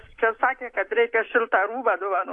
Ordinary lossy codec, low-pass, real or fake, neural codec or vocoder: AAC, 48 kbps; 10.8 kHz; real; none